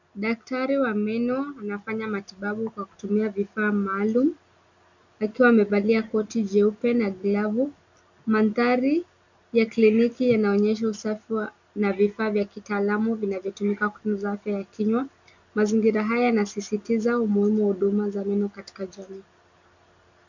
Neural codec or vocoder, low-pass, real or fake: none; 7.2 kHz; real